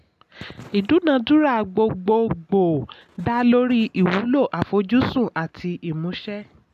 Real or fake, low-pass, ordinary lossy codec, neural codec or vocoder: real; 14.4 kHz; none; none